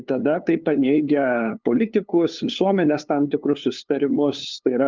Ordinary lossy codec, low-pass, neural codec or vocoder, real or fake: Opus, 32 kbps; 7.2 kHz; codec, 16 kHz, 2 kbps, FunCodec, trained on LibriTTS, 25 frames a second; fake